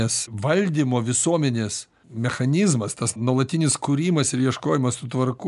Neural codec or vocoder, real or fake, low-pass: none; real; 10.8 kHz